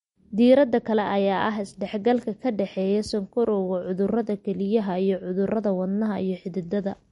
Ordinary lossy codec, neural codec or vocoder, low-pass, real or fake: MP3, 48 kbps; none; 19.8 kHz; real